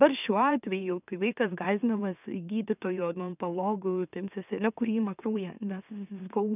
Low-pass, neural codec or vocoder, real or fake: 3.6 kHz; autoencoder, 44.1 kHz, a latent of 192 numbers a frame, MeloTTS; fake